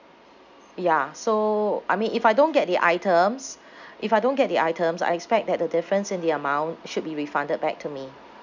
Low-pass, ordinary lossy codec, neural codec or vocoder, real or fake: 7.2 kHz; none; none; real